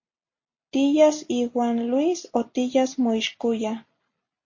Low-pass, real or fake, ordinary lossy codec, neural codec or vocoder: 7.2 kHz; real; MP3, 32 kbps; none